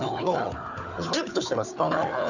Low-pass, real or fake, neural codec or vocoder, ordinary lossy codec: 7.2 kHz; fake; codec, 16 kHz, 4 kbps, FunCodec, trained on Chinese and English, 50 frames a second; none